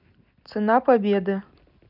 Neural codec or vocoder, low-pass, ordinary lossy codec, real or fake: none; 5.4 kHz; none; real